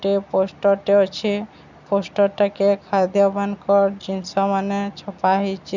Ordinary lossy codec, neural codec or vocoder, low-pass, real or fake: none; none; 7.2 kHz; real